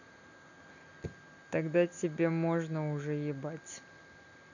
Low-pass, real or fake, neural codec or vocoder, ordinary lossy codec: 7.2 kHz; real; none; none